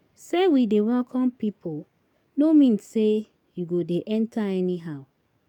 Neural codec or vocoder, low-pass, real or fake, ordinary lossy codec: codec, 44.1 kHz, 7.8 kbps, DAC; 19.8 kHz; fake; none